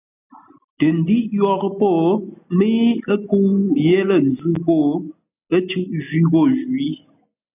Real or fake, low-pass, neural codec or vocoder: real; 3.6 kHz; none